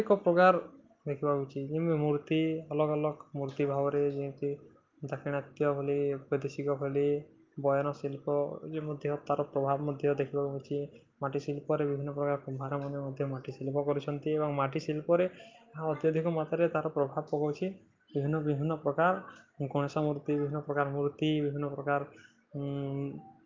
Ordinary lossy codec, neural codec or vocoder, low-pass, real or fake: Opus, 32 kbps; none; 7.2 kHz; real